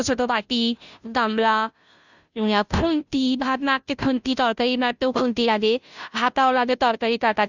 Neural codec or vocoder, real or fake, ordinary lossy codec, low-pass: codec, 16 kHz, 0.5 kbps, FunCodec, trained on Chinese and English, 25 frames a second; fake; none; 7.2 kHz